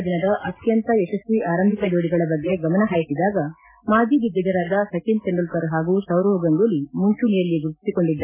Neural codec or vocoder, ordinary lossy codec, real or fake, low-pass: none; MP3, 16 kbps; real; 3.6 kHz